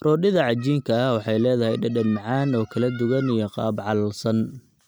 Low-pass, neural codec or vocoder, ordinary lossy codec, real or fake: none; none; none; real